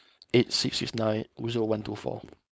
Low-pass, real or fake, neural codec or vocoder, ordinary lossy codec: none; fake; codec, 16 kHz, 4.8 kbps, FACodec; none